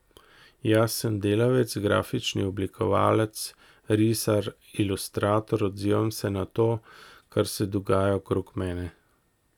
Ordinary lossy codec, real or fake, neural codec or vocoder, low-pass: none; real; none; 19.8 kHz